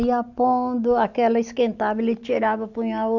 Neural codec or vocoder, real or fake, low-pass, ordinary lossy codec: none; real; 7.2 kHz; none